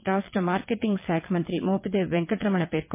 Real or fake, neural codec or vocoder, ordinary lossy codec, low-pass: fake; vocoder, 22.05 kHz, 80 mel bands, WaveNeXt; MP3, 24 kbps; 3.6 kHz